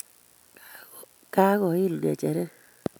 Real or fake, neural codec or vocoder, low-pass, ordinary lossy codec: real; none; none; none